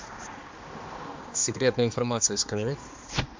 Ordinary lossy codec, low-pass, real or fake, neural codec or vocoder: MP3, 64 kbps; 7.2 kHz; fake; codec, 16 kHz, 2 kbps, X-Codec, HuBERT features, trained on balanced general audio